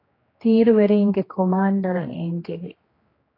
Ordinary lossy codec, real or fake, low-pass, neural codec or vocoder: AAC, 24 kbps; fake; 5.4 kHz; codec, 16 kHz, 2 kbps, X-Codec, HuBERT features, trained on general audio